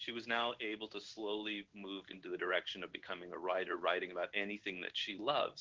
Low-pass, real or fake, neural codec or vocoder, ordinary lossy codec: 7.2 kHz; fake; codec, 16 kHz in and 24 kHz out, 1 kbps, XY-Tokenizer; Opus, 16 kbps